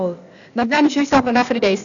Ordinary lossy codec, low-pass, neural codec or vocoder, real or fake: none; 7.2 kHz; codec, 16 kHz, 0.8 kbps, ZipCodec; fake